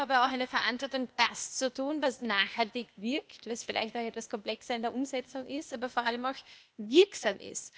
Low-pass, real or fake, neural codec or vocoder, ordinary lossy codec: none; fake; codec, 16 kHz, 0.8 kbps, ZipCodec; none